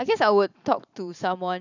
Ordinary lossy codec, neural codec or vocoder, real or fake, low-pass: none; vocoder, 44.1 kHz, 128 mel bands every 512 samples, BigVGAN v2; fake; 7.2 kHz